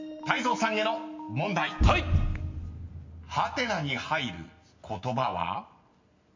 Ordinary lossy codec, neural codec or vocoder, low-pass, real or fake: MP3, 48 kbps; none; 7.2 kHz; real